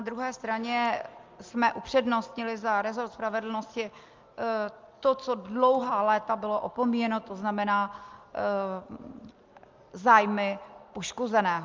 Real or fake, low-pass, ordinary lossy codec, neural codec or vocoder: real; 7.2 kHz; Opus, 32 kbps; none